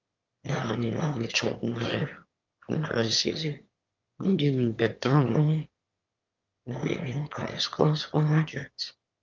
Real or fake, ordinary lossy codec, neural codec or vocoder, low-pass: fake; Opus, 24 kbps; autoencoder, 22.05 kHz, a latent of 192 numbers a frame, VITS, trained on one speaker; 7.2 kHz